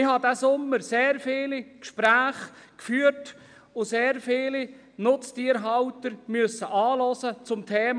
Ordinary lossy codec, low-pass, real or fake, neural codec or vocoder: none; 9.9 kHz; real; none